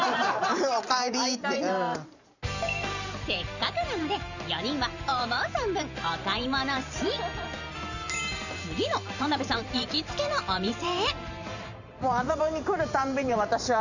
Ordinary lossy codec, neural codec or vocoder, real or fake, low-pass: none; vocoder, 44.1 kHz, 128 mel bands every 512 samples, BigVGAN v2; fake; 7.2 kHz